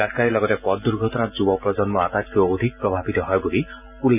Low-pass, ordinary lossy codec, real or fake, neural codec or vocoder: 3.6 kHz; none; real; none